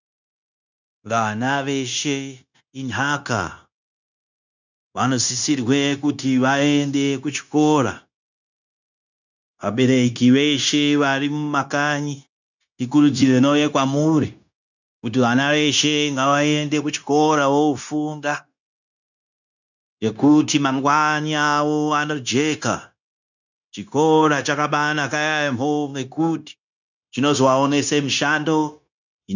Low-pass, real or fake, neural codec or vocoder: 7.2 kHz; fake; codec, 16 kHz, 0.9 kbps, LongCat-Audio-Codec